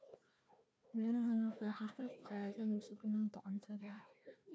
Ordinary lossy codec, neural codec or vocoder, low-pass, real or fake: none; codec, 16 kHz, 1 kbps, FunCodec, trained on Chinese and English, 50 frames a second; none; fake